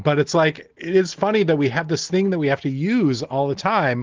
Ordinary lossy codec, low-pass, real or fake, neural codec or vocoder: Opus, 16 kbps; 7.2 kHz; real; none